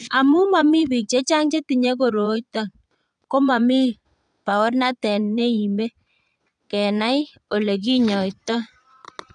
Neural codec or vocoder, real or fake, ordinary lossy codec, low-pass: vocoder, 22.05 kHz, 80 mel bands, Vocos; fake; none; 9.9 kHz